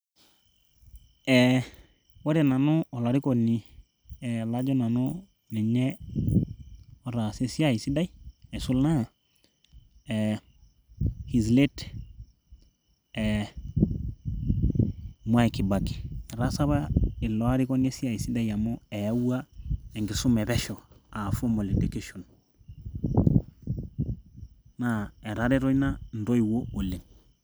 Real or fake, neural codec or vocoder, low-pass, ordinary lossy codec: real; none; none; none